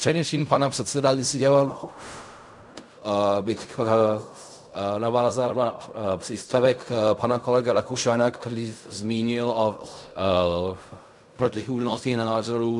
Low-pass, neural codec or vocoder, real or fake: 10.8 kHz; codec, 16 kHz in and 24 kHz out, 0.4 kbps, LongCat-Audio-Codec, fine tuned four codebook decoder; fake